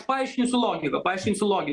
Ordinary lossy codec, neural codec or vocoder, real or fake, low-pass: Opus, 32 kbps; none; real; 10.8 kHz